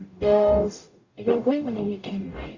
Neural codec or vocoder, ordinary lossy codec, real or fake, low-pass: codec, 44.1 kHz, 0.9 kbps, DAC; Opus, 64 kbps; fake; 7.2 kHz